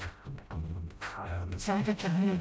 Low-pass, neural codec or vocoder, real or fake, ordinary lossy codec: none; codec, 16 kHz, 0.5 kbps, FreqCodec, smaller model; fake; none